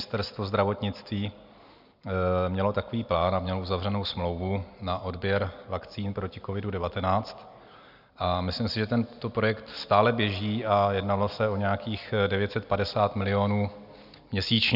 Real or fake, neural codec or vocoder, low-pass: real; none; 5.4 kHz